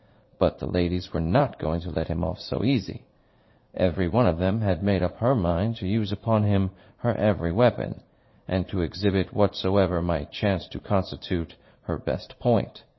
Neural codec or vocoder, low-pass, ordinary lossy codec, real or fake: vocoder, 44.1 kHz, 80 mel bands, Vocos; 7.2 kHz; MP3, 24 kbps; fake